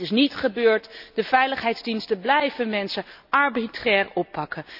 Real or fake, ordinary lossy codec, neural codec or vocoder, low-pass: real; none; none; 5.4 kHz